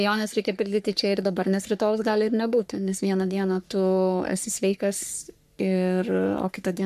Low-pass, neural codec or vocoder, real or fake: 14.4 kHz; codec, 44.1 kHz, 3.4 kbps, Pupu-Codec; fake